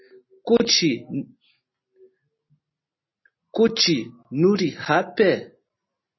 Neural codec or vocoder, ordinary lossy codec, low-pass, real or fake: none; MP3, 24 kbps; 7.2 kHz; real